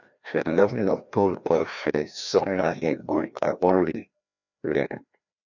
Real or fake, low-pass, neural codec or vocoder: fake; 7.2 kHz; codec, 16 kHz, 1 kbps, FreqCodec, larger model